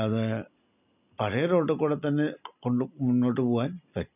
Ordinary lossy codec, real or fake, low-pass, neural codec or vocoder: none; real; 3.6 kHz; none